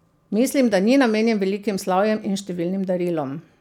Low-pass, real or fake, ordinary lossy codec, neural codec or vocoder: 19.8 kHz; real; none; none